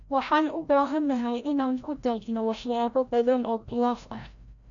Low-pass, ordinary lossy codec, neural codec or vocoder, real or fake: 7.2 kHz; none; codec, 16 kHz, 0.5 kbps, FreqCodec, larger model; fake